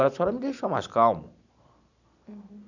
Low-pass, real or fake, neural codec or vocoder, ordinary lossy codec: 7.2 kHz; real; none; none